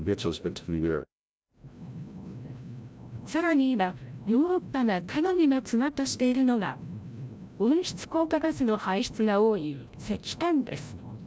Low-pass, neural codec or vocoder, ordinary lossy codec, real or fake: none; codec, 16 kHz, 0.5 kbps, FreqCodec, larger model; none; fake